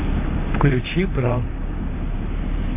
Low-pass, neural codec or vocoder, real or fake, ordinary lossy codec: 3.6 kHz; vocoder, 44.1 kHz, 128 mel bands, Pupu-Vocoder; fake; MP3, 24 kbps